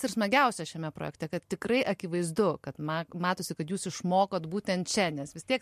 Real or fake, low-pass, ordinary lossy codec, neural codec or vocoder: real; 14.4 kHz; MP3, 64 kbps; none